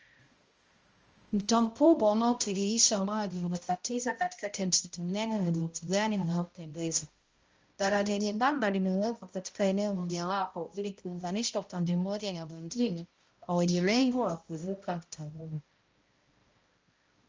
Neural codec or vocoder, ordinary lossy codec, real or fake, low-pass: codec, 16 kHz, 0.5 kbps, X-Codec, HuBERT features, trained on balanced general audio; Opus, 24 kbps; fake; 7.2 kHz